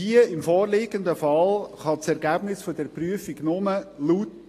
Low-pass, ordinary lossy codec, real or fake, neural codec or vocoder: 14.4 kHz; AAC, 48 kbps; real; none